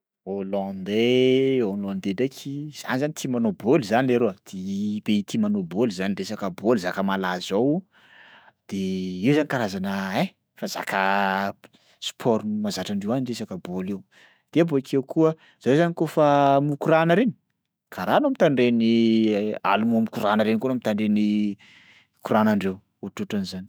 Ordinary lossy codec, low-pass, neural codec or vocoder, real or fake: none; none; autoencoder, 48 kHz, 128 numbers a frame, DAC-VAE, trained on Japanese speech; fake